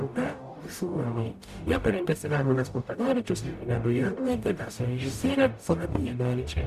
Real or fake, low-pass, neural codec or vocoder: fake; 14.4 kHz; codec, 44.1 kHz, 0.9 kbps, DAC